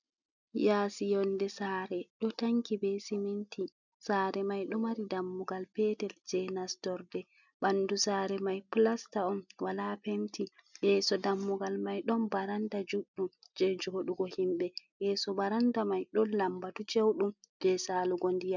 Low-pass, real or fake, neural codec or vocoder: 7.2 kHz; real; none